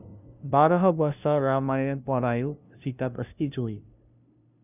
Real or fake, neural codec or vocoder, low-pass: fake; codec, 16 kHz, 0.5 kbps, FunCodec, trained on LibriTTS, 25 frames a second; 3.6 kHz